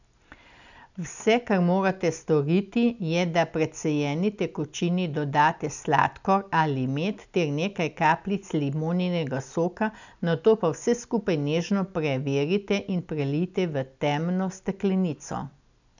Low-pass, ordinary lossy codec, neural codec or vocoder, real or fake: 7.2 kHz; none; none; real